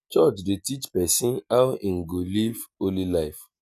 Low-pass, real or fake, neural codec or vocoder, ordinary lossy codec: 14.4 kHz; real; none; none